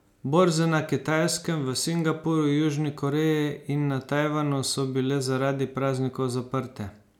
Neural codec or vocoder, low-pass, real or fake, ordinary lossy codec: none; 19.8 kHz; real; none